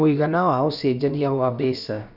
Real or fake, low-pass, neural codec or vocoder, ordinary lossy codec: fake; 5.4 kHz; codec, 16 kHz, about 1 kbps, DyCAST, with the encoder's durations; none